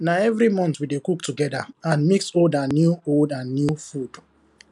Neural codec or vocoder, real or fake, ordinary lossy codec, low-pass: none; real; none; 10.8 kHz